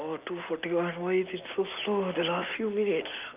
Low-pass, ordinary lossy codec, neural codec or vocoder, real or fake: 3.6 kHz; Opus, 24 kbps; none; real